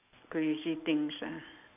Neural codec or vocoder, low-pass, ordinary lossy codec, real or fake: none; 3.6 kHz; none; real